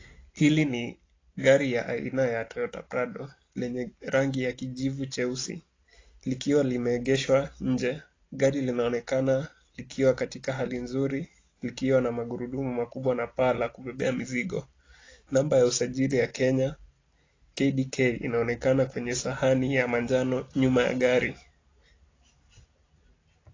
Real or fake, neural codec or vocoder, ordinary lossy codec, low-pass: real; none; AAC, 32 kbps; 7.2 kHz